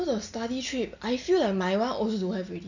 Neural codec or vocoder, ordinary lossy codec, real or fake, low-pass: none; none; real; 7.2 kHz